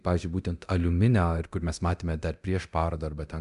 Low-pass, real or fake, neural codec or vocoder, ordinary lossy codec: 10.8 kHz; fake; codec, 24 kHz, 0.9 kbps, DualCodec; MP3, 96 kbps